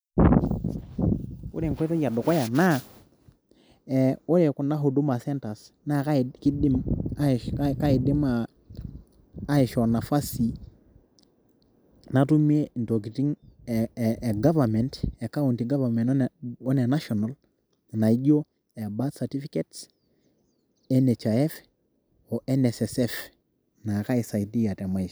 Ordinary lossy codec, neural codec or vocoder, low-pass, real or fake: none; none; none; real